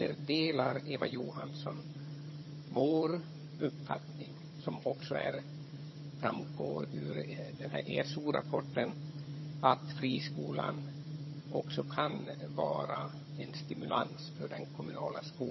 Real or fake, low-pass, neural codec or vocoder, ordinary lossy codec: fake; 7.2 kHz; vocoder, 22.05 kHz, 80 mel bands, HiFi-GAN; MP3, 24 kbps